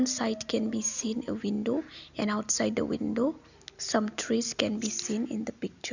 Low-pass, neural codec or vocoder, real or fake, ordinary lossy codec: 7.2 kHz; none; real; none